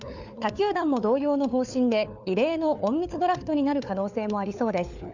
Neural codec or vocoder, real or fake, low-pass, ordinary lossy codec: codec, 16 kHz, 4 kbps, FunCodec, trained on LibriTTS, 50 frames a second; fake; 7.2 kHz; none